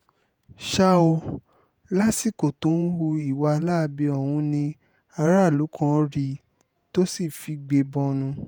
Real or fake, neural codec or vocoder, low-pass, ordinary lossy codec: fake; vocoder, 48 kHz, 128 mel bands, Vocos; none; none